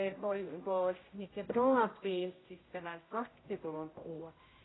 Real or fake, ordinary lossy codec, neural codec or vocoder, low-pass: fake; AAC, 16 kbps; codec, 16 kHz, 0.5 kbps, X-Codec, HuBERT features, trained on general audio; 7.2 kHz